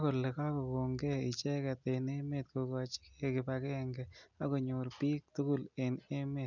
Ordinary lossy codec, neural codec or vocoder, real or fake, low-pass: none; none; real; 7.2 kHz